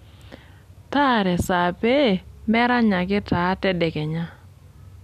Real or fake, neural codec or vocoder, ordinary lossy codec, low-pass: real; none; none; 14.4 kHz